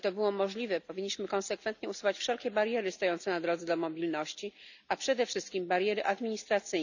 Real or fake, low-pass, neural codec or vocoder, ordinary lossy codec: real; 7.2 kHz; none; none